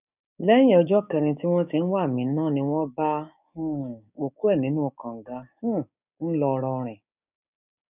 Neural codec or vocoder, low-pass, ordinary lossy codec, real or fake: codec, 16 kHz, 6 kbps, DAC; 3.6 kHz; none; fake